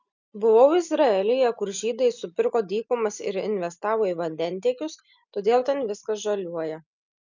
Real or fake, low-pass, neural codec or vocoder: real; 7.2 kHz; none